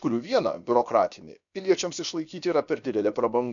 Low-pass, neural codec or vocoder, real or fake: 7.2 kHz; codec, 16 kHz, about 1 kbps, DyCAST, with the encoder's durations; fake